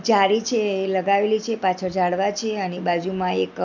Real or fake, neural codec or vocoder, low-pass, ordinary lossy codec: real; none; 7.2 kHz; none